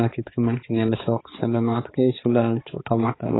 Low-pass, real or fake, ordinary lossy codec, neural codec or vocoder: 7.2 kHz; fake; AAC, 16 kbps; codec, 16 kHz, 4 kbps, X-Codec, HuBERT features, trained on balanced general audio